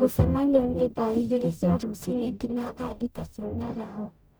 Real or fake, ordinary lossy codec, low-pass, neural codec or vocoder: fake; none; none; codec, 44.1 kHz, 0.9 kbps, DAC